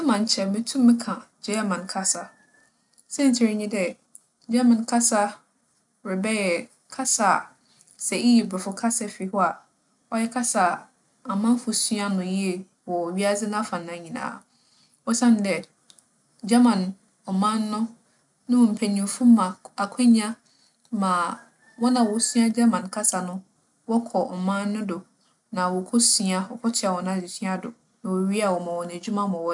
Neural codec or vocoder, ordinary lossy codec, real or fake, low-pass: none; none; real; 10.8 kHz